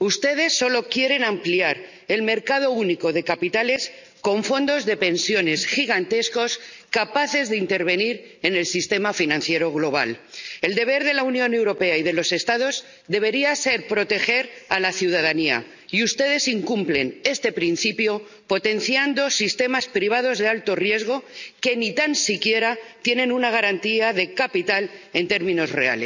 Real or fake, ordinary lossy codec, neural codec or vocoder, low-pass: real; none; none; 7.2 kHz